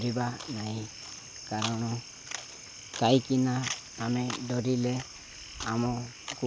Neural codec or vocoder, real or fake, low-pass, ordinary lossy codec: none; real; none; none